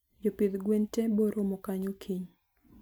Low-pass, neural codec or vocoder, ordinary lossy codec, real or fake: none; none; none; real